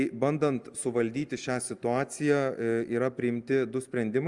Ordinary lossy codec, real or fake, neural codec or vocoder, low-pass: Opus, 32 kbps; real; none; 10.8 kHz